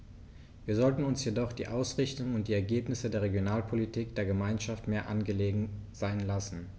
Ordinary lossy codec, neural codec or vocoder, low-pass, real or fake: none; none; none; real